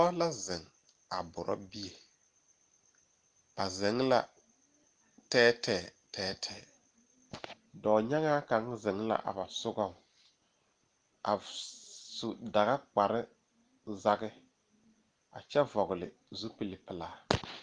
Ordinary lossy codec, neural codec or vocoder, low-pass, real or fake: Opus, 24 kbps; none; 9.9 kHz; real